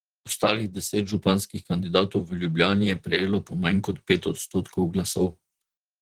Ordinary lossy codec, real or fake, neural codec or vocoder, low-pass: Opus, 16 kbps; fake; vocoder, 44.1 kHz, 128 mel bands, Pupu-Vocoder; 19.8 kHz